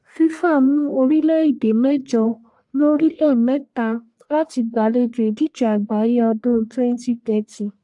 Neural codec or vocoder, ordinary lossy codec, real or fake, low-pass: codec, 44.1 kHz, 1.7 kbps, Pupu-Codec; AAC, 64 kbps; fake; 10.8 kHz